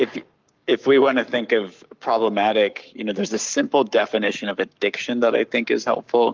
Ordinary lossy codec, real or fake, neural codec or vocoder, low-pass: Opus, 24 kbps; fake; codec, 44.1 kHz, 7.8 kbps, Pupu-Codec; 7.2 kHz